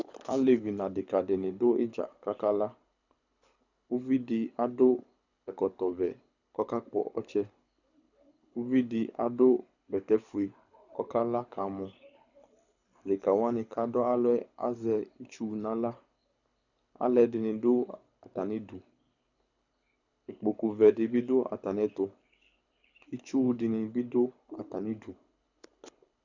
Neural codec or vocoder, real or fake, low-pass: codec, 24 kHz, 6 kbps, HILCodec; fake; 7.2 kHz